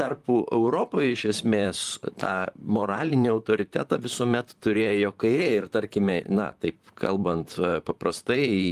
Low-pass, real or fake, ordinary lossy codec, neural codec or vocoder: 14.4 kHz; fake; Opus, 32 kbps; vocoder, 44.1 kHz, 128 mel bands, Pupu-Vocoder